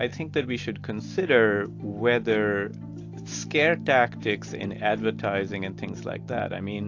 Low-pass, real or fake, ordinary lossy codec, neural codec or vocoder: 7.2 kHz; real; AAC, 48 kbps; none